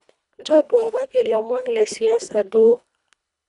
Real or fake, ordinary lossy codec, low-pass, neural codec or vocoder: fake; none; 10.8 kHz; codec, 24 kHz, 1.5 kbps, HILCodec